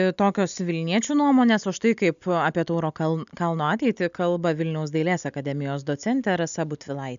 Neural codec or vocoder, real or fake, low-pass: none; real; 7.2 kHz